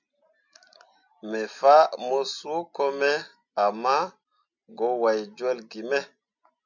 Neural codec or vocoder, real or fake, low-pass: none; real; 7.2 kHz